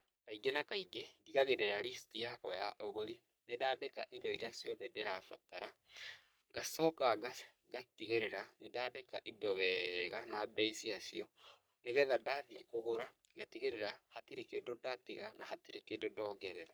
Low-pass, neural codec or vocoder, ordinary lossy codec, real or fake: none; codec, 44.1 kHz, 3.4 kbps, Pupu-Codec; none; fake